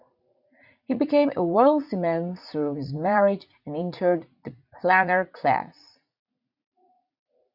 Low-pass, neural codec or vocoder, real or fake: 5.4 kHz; vocoder, 44.1 kHz, 80 mel bands, Vocos; fake